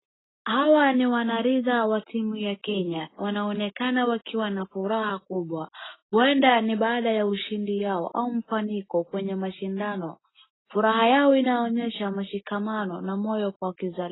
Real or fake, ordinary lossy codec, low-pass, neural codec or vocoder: real; AAC, 16 kbps; 7.2 kHz; none